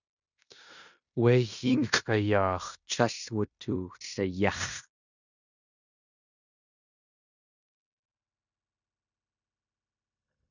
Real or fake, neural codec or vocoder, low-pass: fake; codec, 16 kHz in and 24 kHz out, 0.9 kbps, LongCat-Audio-Codec, fine tuned four codebook decoder; 7.2 kHz